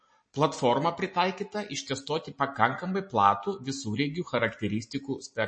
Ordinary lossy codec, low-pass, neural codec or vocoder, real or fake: MP3, 32 kbps; 9.9 kHz; none; real